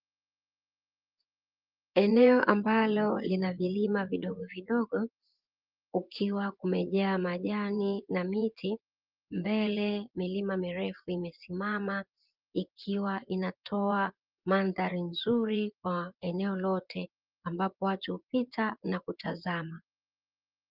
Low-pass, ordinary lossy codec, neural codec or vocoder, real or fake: 5.4 kHz; Opus, 24 kbps; vocoder, 24 kHz, 100 mel bands, Vocos; fake